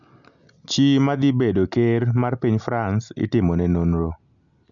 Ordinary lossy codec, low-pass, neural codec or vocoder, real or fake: none; 7.2 kHz; none; real